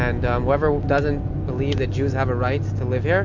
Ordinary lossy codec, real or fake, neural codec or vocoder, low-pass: MP3, 48 kbps; real; none; 7.2 kHz